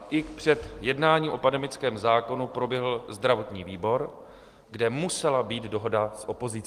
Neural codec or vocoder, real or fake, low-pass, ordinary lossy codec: none; real; 14.4 kHz; Opus, 24 kbps